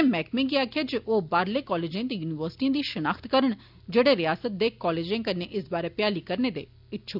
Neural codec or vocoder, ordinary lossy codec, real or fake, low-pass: none; none; real; 5.4 kHz